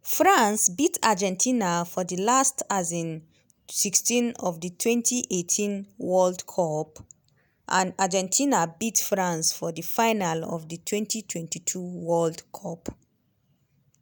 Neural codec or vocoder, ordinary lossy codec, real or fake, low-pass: none; none; real; none